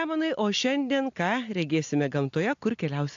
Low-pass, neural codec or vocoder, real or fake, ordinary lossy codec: 7.2 kHz; none; real; AAC, 64 kbps